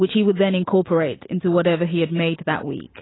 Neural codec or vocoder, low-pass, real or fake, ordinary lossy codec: none; 7.2 kHz; real; AAC, 16 kbps